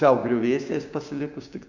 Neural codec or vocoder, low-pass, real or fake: codec, 16 kHz, 6 kbps, DAC; 7.2 kHz; fake